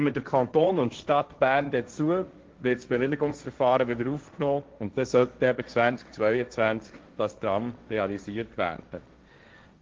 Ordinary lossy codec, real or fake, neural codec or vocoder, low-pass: Opus, 32 kbps; fake; codec, 16 kHz, 1.1 kbps, Voila-Tokenizer; 7.2 kHz